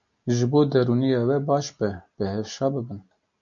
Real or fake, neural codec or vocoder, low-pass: real; none; 7.2 kHz